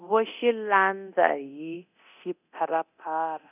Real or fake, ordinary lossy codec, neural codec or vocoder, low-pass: fake; none; codec, 24 kHz, 0.9 kbps, DualCodec; 3.6 kHz